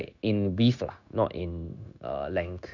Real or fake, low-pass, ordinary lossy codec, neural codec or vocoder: fake; 7.2 kHz; none; codec, 16 kHz, 0.9 kbps, LongCat-Audio-Codec